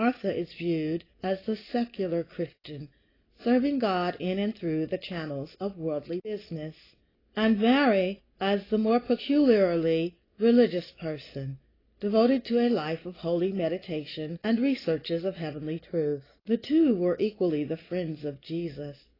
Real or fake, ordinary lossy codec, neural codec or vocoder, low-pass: real; AAC, 24 kbps; none; 5.4 kHz